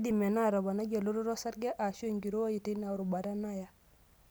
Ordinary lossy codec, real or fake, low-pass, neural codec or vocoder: none; real; none; none